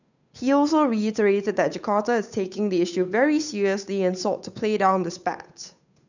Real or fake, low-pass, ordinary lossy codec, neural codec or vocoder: fake; 7.2 kHz; none; codec, 16 kHz, 8 kbps, FunCodec, trained on Chinese and English, 25 frames a second